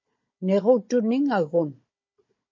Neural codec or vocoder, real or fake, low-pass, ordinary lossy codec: codec, 16 kHz, 16 kbps, FunCodec, trained on Chinese and English, 50 frames a second; fake; 7.2 kHz; MP3, 32 kbps